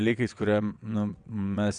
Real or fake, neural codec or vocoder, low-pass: fake; vocoder, 22.05 kHz, 80 mel bands, WaveNeXt; 9.9 kHz